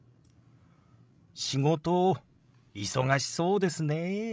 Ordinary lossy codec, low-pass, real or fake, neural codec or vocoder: none; none; fake; codec, 16 kHz, 16 kbps, FreqCodec, larger model